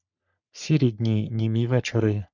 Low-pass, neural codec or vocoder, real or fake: 7.2 kHz; codec, 44.1 kHz, 3.4 kbps, Pupu-Codec; fake